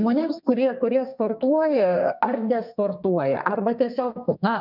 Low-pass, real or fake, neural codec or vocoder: 5.4 kHz; fake; codec, 32 kHz, 1.9 kbps, SNAC